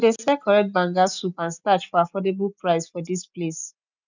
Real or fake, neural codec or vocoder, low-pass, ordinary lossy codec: real; none; 7.2 kHz; none